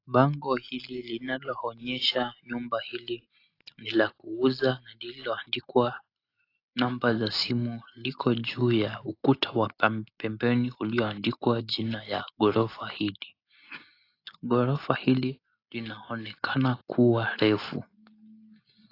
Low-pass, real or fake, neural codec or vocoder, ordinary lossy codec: 5.4 kHz; real; none; AAC, 32 kbps